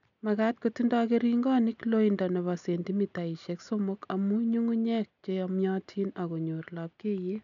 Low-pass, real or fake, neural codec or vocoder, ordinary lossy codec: 7.2 kHz; real; none; none